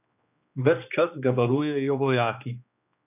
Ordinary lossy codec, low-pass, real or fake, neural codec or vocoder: none; 3.6 kHz; fake; codec, 16 kHz, 2 kbps, X-Codec, HuBERT features, trained on general audio